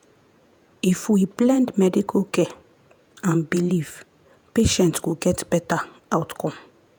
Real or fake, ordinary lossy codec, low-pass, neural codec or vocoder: fake; none; none; vocoder, 48 kHz, 128 mel bands, Vocos